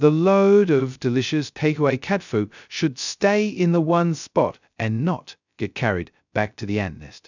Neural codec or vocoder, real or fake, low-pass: codec, 16 kHz, 0.2 kbps, FocalCodec; fake; 7.2 kHz